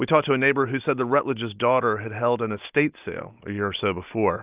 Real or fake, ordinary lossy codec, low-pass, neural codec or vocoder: real; Opus, 32 kbps; 3.6 kHz; none